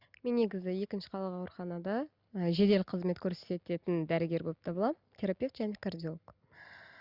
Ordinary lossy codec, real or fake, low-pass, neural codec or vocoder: Opus, 64 kbps; real; 5.4 kHz; none